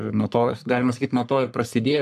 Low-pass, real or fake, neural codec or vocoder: 14.4 kHz; fake; codec, 44.1 kHz, 3.4 kbps, Pupu-Codec